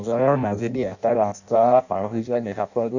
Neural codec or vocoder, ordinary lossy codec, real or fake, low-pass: codec, 16 kHz in and 24 kHz out, 0.6 kbps, FireRedTTS-2 codec; none; fake; 7.2 kHz